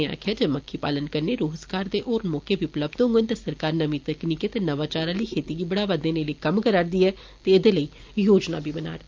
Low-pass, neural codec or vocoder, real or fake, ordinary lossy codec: 7.2 kHz; none; real; Opus, 24 kbps